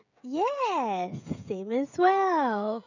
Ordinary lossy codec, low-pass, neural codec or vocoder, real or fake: none; 7.2 kHz; codec, 16 kHz, 16 kbps, FreqCodec, smaller model; fake